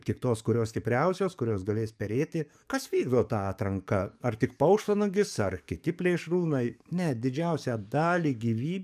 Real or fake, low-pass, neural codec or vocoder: fake; 14.4 kHz; codec, 44.1 kHz, 7.8 kbps, DAC